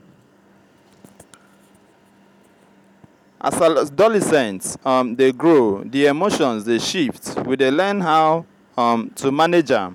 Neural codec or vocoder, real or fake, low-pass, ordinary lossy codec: none; real; 19.8 kHz; none